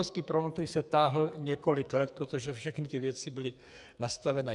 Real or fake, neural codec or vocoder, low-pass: fake; codec, 44.1 kHz, 2.6 kbps, SNAC; 10.8 kHz